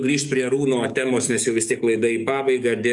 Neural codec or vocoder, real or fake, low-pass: codec, 44.1 kHz, 7.8 kbps, DAC; fake; 10.8 kHz